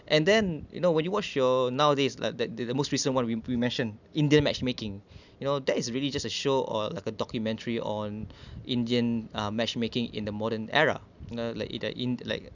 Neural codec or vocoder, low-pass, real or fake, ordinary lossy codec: none; 7.2 kHz; real; none